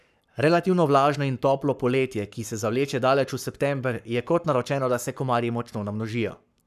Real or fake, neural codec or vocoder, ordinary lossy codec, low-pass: fake; codec, 44.1 kHz, 7.8 kbps, Pupu-Codec; none; 14.4 kHz